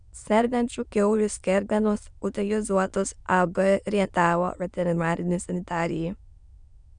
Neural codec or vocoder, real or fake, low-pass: autoencoder, 22.05 kHz, a latent of 192 numbers a frame, VITS, trained on many speakers; fake; 9.9 kHz